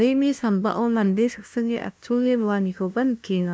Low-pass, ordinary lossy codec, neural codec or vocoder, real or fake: none; none; codec, 16 kHz, 0.5 kbps, FunCodec, trained on LibriTTS, 25 frames a second; fake